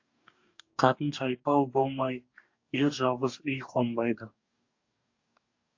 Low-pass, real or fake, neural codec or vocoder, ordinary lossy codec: 7.2 kHz; fake; codec, 44.1 kHz, 2.6 kbps, DAC; AAC, 48 kbps